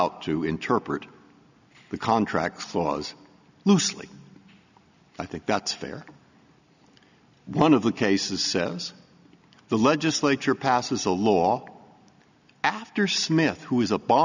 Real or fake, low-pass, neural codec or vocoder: real; 7.2 kHz; none